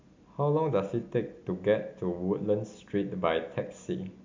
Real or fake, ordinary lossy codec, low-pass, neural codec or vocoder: real; MP3, 64 kbps; 7.2 kHz; none